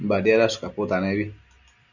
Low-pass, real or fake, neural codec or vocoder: 7.2 kHz; real; none